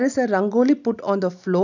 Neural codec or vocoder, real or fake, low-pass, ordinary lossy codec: none; real; 7.2 kHz; none